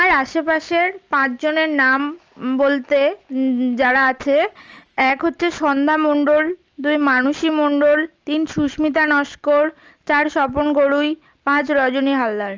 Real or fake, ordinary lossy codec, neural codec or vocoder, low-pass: real; Opus, 24 kbps; none; 7.2 kHz